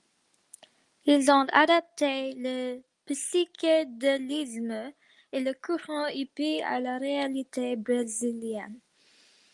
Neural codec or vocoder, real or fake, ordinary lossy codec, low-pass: none; real; Opus, 32 kbps; 10.8 kHz